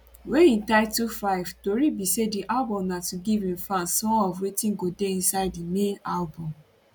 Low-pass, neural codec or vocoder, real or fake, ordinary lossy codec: none; none; real; none